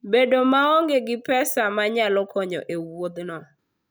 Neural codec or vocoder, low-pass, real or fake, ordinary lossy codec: none; none; real; none